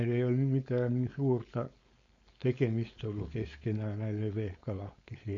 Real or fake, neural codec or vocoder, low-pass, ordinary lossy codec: fake; codec, 16 kHz, 4.8 kbps, FACodec; 7.2 kHz; MP3, 48 kbps